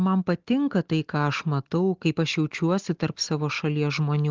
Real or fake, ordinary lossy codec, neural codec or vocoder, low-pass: real; Opus, 16 kbps; none; 7.2 kHz